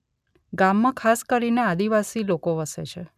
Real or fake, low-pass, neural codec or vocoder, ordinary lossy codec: real; 14.4 kHz; none; none